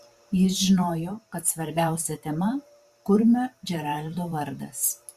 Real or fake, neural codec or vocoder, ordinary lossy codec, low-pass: fake; vocoder, 44.1 kHz, 128 mel bands every 512 samples, BigVGAN v2; Opus, 64 kbps; 14.4 kHz